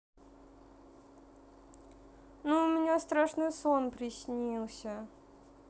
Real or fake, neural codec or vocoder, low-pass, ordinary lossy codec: real; none; none; none